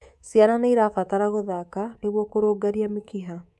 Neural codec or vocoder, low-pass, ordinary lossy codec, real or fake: codec, 24 kHz, 3.1 kbps, DualCodec; none; none; fake